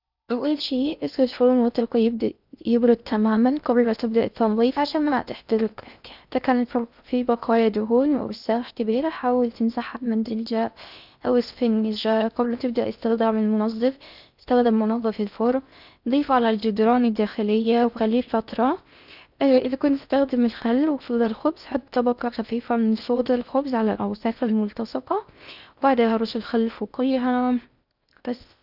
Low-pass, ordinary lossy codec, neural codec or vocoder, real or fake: 5.4 kHz; none; codec, 16 kHz in and 24 kHz out, 0.6 kbps, FocalCodec, streaming, 4096 codes; fake